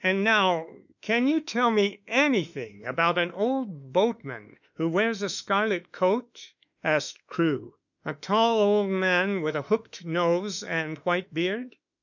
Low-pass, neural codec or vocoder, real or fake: 7.2 kHz; autoencoder, 48 kHz, 32 numbers a frame, DAC-VAE, trained on Japanese speech; fake